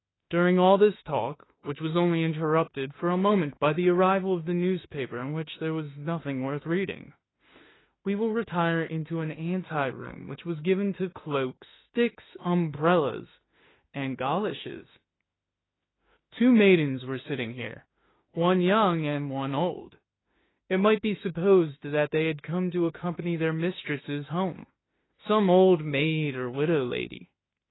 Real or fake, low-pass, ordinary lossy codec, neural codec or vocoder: fake; 7.2 kHz; AAC, 16 kbps; autoencoder, 48 kHz, 32 numbers a frame, DAC-VAE, trained on Japanese speech